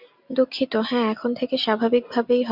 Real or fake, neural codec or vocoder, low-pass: real; none; 5.4 kHz